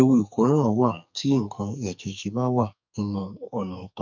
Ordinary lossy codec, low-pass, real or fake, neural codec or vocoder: none; 7.2 kHz; fake; codec, 44.1 kHz, 2.6 kbps, SNAC